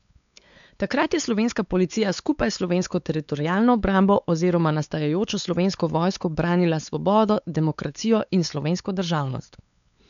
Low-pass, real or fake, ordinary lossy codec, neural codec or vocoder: 7.2 kHz; fake; none; codec, 16 kHz, 4 kbps, X-Codec, WavLM features, trained on Multilingual LibriSpeech